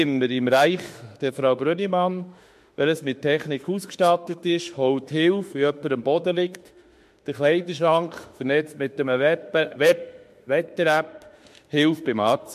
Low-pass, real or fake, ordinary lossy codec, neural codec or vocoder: 14.4 kHz; fake; MP3, 64 kbps; autoencoder, 48 kHz, 32 numbers a frame, DAC-VAE, trained on Japanese speech